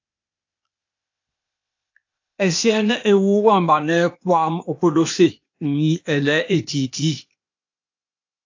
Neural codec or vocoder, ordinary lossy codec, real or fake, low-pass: codec, 16 kHz, 0.8 kbps, ZipCodec; AAC, 48 kbps; fake; 7.2 kHz